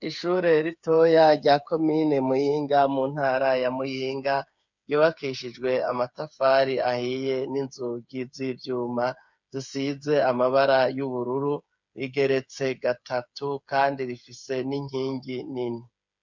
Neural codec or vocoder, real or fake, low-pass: codec, 16 kHz, 8 kbps, FreqCodec, smaller model; fake; 7.2 kHz